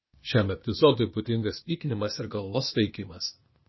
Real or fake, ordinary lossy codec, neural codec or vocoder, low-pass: fake; MP3, 24 kbps; codec, 16 kHz, 0.8 kbps, ZipCodec; 7.2 kHz